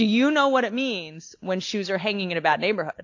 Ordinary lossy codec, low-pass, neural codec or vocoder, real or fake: AAC, 48 kbps; 7.2 kHz; none; real